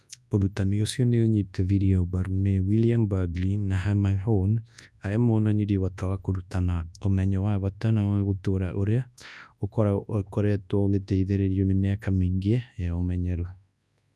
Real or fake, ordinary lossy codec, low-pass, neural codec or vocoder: fake; none; none; codec, 24 kHz, 0.9 kbps, WavTokenizer, large speech release